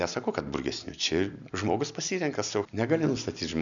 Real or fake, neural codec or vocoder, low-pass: real; none; 7.2 kHz